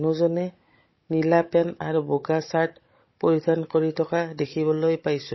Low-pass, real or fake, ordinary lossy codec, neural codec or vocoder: 7.2 kHz; fake; MP3, 24 kbps; codec, 16 kHz, 16 kbps, FunCodec, trained on Chinese and English, 50 frames a second